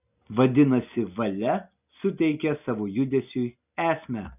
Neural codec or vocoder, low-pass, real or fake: none; 3.6 kHz; real